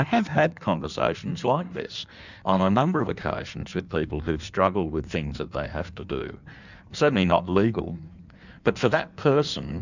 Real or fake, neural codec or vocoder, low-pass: fake; codec, 16 kHz in and 24 kHz out, 1.1 kbps, FireRedTTS-2 codec; 7.2 kHz